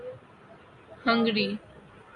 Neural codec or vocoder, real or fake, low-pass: none; real; 10.8 kHz